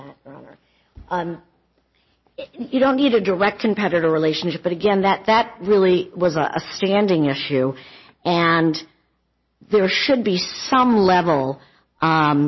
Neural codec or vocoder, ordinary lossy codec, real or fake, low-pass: none; MP3, 24 kbps; real; 7.2 kHz